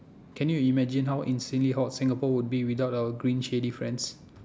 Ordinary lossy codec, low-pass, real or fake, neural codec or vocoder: none; none; real; none